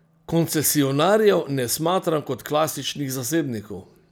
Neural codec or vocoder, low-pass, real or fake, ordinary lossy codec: none; none; real; none